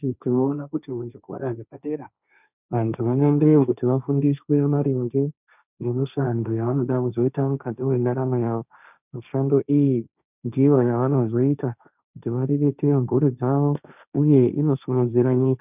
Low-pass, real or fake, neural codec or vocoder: 3.6 kHz; fake; codec, 16 kHz, 1.1 kbps, Voila-Tokenizer